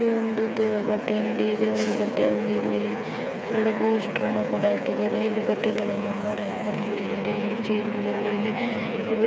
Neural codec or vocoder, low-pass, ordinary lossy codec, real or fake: codec, 16 kHz, 4 kbps, FreqCodec, smaller model; none; none; fake